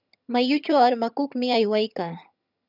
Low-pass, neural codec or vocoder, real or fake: 5.4 kHz; vocoder, 22.05 kHz, 80 mel bands, HiFi-GAN; fake